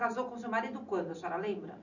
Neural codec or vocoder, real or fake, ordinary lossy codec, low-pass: none; real; none; 7.2 kHz